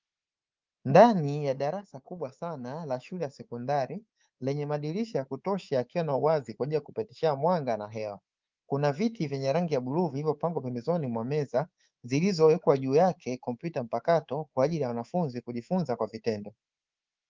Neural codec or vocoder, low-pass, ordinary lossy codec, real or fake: codec, 24 kHz, 3.1 kbps, DualCodec; 7.2 kHz; Opus, 32 kbps; fake